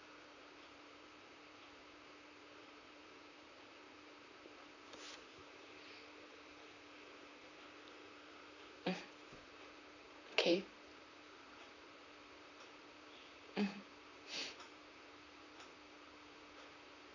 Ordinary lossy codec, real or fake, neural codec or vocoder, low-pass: none; fake; vocoder, 44.1 kHz, 128 mel bands, Pupu-Vocoder; 7.2 kHz